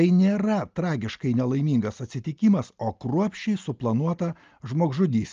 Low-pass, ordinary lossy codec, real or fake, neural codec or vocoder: 7.2 kHz; Opus, 24 kbps; real; none